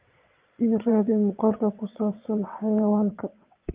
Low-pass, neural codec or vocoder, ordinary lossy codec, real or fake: 3.6 kHz; vocoder, 22.05 kHz, 80 mel bands, WaveNeXt; Opus, 32 kbps; fake